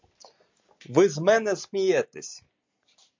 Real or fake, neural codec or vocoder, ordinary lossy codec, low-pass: real; none; MP3, 64 kbps; 7.2 kHz